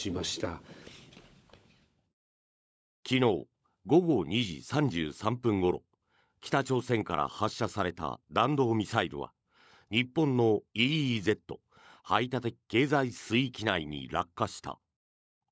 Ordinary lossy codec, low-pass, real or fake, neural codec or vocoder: none; none; fake; codec, 16 kHz, 16 kbps, FunCodec, trained on LibriTTS, 50 frames a second